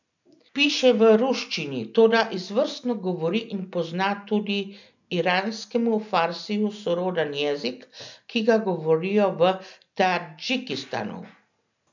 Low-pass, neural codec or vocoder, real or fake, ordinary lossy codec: 7.2 kHz; none; real; none